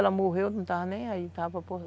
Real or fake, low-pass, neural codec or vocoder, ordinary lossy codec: real; none; none; none